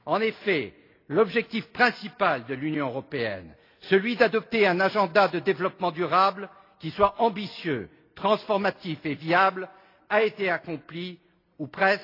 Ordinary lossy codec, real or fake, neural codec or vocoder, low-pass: AAC, 32 kbps; real; none; 5.4 kHz